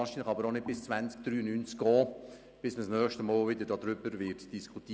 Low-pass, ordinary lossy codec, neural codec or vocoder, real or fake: none; none; none; real